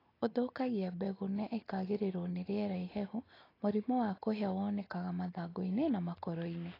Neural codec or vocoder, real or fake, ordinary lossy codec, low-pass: none; real; AAC, 24 kbps; 5.4 kHz